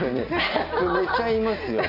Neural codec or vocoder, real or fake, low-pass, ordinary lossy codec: none; real; 5.4 kHz; none